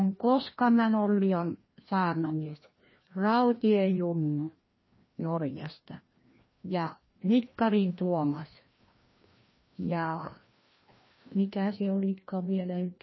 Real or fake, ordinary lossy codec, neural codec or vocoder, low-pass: fake; MP3, 24 kbps; codec, 16 kHz, 1 kbps, FreqCodec, larger model; 7.2 kHz